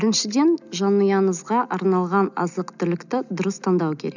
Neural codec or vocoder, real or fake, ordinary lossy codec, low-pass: none; real; none; 7.2 kHz